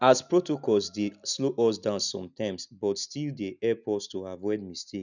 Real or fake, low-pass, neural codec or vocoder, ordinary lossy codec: real; 7.2 kHz; none; none